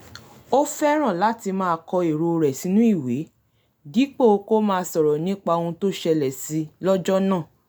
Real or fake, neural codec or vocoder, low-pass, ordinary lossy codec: real; none; none; none